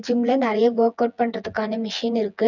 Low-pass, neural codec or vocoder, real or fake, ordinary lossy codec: 7.2 kHz; vocoder, 24 kHz, 100 mel bands, Vocos; fake; none